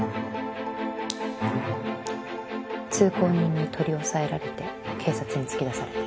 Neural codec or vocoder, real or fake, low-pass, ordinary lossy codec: none; real; none; none